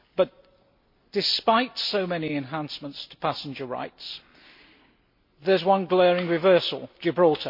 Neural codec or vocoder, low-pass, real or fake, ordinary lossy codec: none; 5.4 kHz; real; MP3, 32 kbps